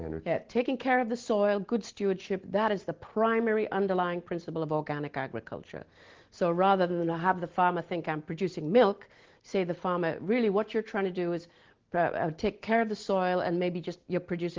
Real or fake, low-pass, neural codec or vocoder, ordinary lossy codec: real; 7.2 kHz; none; Opus, 16 kbps